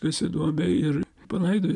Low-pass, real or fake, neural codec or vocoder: 10.8 kHz; real; none